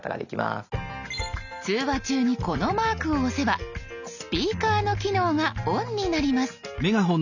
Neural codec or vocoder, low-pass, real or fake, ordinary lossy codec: none; 7.2 kHz; real; none